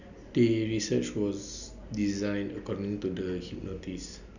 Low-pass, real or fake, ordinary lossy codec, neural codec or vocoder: 7.2 kHz; real; none; none